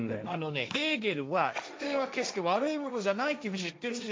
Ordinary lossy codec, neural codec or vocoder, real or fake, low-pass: none; codec, 16 kHz, 1.1 kbps, Voila-Tokenizer; fake; none